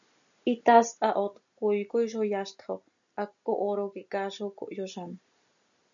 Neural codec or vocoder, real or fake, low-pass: none; real; 7.2 kHz